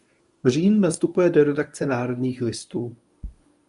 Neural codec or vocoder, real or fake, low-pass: codec, 24 kHz, 0.9 kbps, WavTokenizer, medium speech release version 1; fake; 10.8 kHz